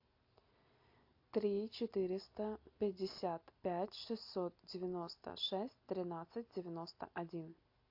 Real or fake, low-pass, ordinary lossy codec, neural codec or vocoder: real; 5.4 kHz; AAC, 48 kbps; none